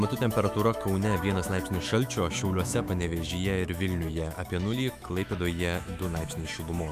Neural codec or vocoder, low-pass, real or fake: autoencoder, 48 kHz, 128 numbers a frame, DAC-VAE, trained on Japanese speech; 14.4 kHz; fake